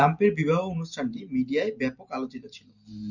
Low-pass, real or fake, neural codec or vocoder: 7.2 kHz; real; none